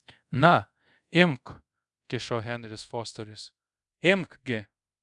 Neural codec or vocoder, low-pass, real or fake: codec, 24 kHz, 0.5 kbps, DualCodec; 10.8 kHz; fake